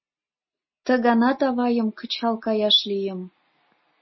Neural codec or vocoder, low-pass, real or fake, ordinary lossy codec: none; 7.2 kHz; real; MP3, 24 kbps